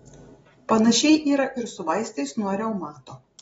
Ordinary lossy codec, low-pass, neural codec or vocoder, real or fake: AAC, 24 kbps; 19.8 kHz; none; real